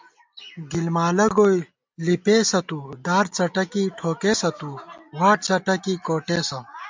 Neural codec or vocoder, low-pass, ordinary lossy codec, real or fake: none; 7.2 kHz; MP3, 64 kbps; real